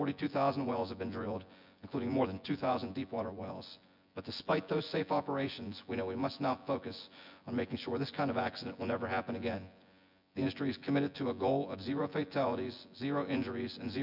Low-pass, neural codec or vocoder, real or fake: 5.4 kHz; vocoder, 24 kHz, 100 mel bands, Vocos; fake